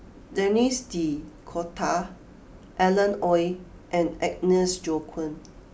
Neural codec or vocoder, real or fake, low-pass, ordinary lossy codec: none; real; none; none